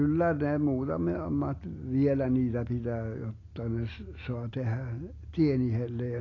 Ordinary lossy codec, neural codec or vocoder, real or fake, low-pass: AAC, 32 kbps; none; real; 7.2 kHz